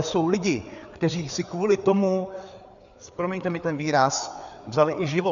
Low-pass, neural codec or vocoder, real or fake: 7.2 kHz; codec, 16 kHz, 4 kbps, FreqCodec, larger model; fake